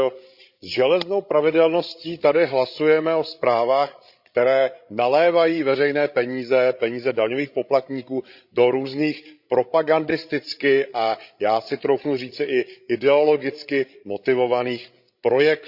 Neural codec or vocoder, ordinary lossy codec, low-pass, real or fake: codec, 16 kHz, 16 kbps, FreqCodec, larger model; Opus, 64 kbps; 5.4 kHz; fake